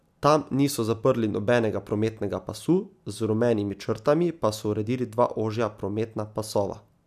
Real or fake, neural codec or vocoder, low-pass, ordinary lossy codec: real; none; 14.4 kHz; none